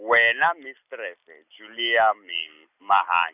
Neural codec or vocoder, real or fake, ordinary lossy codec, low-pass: none; real; none; 3.6 kHz